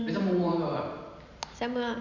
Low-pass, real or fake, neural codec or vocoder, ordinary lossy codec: 7.2 kHz; fake; vocoder, 44.1 kHz, 128 mel bands every 512 samples, BigVGAN v2; none